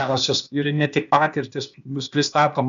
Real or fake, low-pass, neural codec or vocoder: fake; 7.2 kHz; codec, 16 kHz, 0.8 kbps, ZipCodec